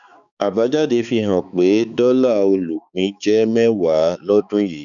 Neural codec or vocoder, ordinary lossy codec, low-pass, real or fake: codec, 16 kHz, 4 kbps, X-Codec, HuBERT features, trained on balanced general audio; none; 7.2 kHz; fake